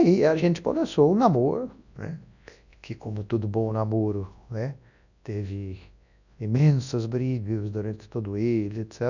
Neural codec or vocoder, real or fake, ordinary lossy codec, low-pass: codec, 24 kHz, 0.9 kbps, WavTokenizer, large speech release; fake; none; 7.2 kHz